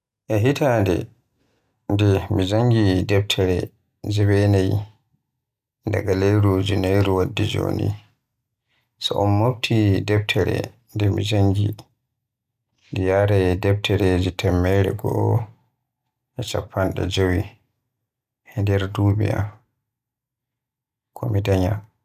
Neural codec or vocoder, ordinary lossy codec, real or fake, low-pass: none; none; real; 14.4 kHz